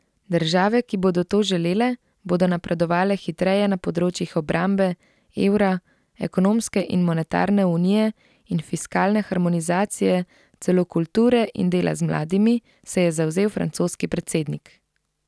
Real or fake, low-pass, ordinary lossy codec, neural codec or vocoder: real; none; none; none